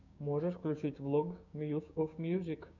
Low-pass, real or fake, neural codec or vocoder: 7.2 kHz; fake; codec, 16 kHz, 6 kbps, DAC